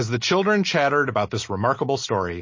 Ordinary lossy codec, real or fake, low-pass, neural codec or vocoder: MP3, 32 kbps; real; 7.2 kHz; none